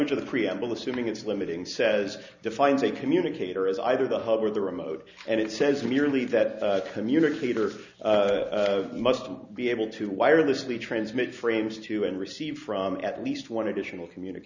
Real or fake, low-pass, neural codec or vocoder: real; 7.2 kHz; none